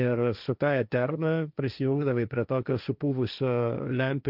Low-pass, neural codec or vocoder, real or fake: 5.4 kHz; codec, 16 kHz, 1.1 kbps, Voila-Tokenizer; fake